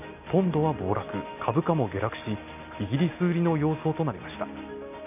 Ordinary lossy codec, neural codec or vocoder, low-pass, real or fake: none; none; 3.6 kHz; real